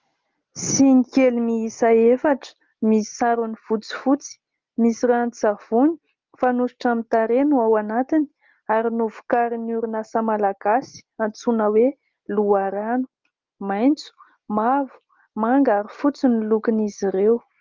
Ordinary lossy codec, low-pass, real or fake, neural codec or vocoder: Opus, 24 kbps; 7.2 kHz; real; none